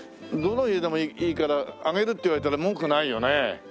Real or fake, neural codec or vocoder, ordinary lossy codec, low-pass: real; none; none; none